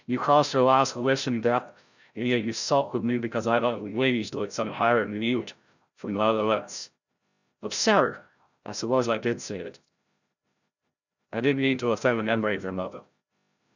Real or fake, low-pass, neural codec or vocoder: fake; 7.2 kHz; codec, 16 kHz, 0.5 kbps, FreqCodec, larger model